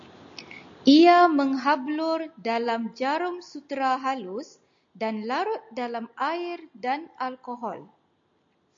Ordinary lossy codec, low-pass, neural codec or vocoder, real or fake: AAC, 64 kbps; 7.2 kHz; none; real